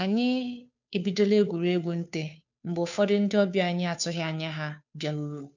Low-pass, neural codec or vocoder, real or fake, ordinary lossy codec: 7.2 kHz; codec, 16 kHz, 2 kbps, FunCodec, trained on Chinese and English, 25 frames a second; fake; none